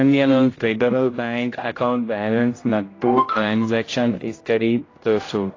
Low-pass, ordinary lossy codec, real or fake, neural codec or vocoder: 7.2 kHz; AAC, 32 kbps; fake; codec, 16 kHz, 0.5 kbps, X-Codec, HuBERT features, trained on general audio